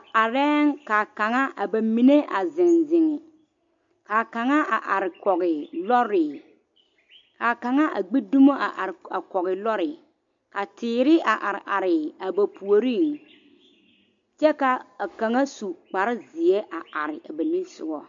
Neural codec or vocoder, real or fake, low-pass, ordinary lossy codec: none; real; 7.2 kHz; MP3, 48 kbps